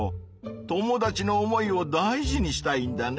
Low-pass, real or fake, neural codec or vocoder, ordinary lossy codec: none; real; none; none